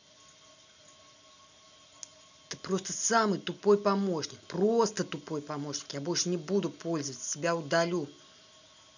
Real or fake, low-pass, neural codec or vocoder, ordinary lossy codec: real; 7.2 kHz; none; none